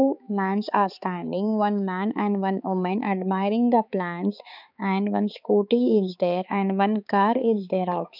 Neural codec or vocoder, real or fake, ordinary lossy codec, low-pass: codec, 16 kHz, 4 kbps, X-Codec, HuBERT features, trained on balanced general audio; fake; none; 5.4 kHz